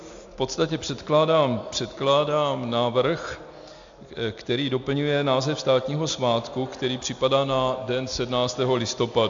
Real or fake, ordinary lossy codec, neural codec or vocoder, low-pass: real; AAC, 64 kbps; none; 7.2 kHz